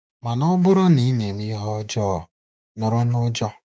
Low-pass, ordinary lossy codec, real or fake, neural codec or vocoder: none; none; fake; codec, 16 kHz, 6 kbps, DAC